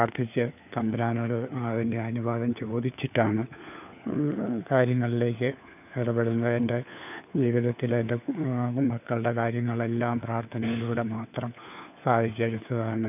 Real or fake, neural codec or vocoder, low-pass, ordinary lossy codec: fake; codec, 16 kHz, 4 kbps, FunCodec, trained on LibriTTS, 50 frames a second; 3.6 kHz; none